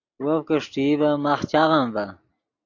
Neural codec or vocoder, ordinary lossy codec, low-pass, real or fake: none; AAC, 48 kbps; 7.2 kHz; real